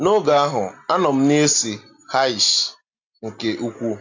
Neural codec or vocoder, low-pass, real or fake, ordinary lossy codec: none; 7.2 kHz; real; AAC, 48 kbps